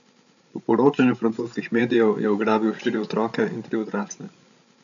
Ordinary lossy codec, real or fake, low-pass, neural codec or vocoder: none; fake; 7.2 kHz; codec, 16 kHz, 16 kbps, FreqCodec, larger model